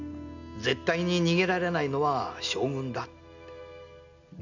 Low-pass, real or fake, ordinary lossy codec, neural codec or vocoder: 7.2 kHz; real; none; none